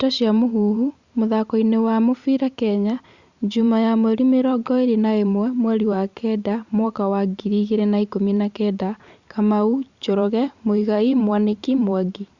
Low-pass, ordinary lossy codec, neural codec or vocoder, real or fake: 7.2 kHz; Opus, 64 kbps; vocoder, 44.1 kHz, 128 mel bands every 512 samples, BigVGAN v2; fake